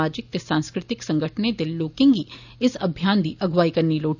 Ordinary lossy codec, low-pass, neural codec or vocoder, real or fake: none; 7.2 kHz; none; real